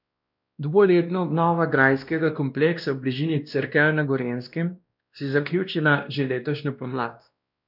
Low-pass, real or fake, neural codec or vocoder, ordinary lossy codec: 5.4 kHz; fake; codec, 16 kHz, 1 kbps, X-Codec, WavLM features, trained on Multilingual LibriSpeech; none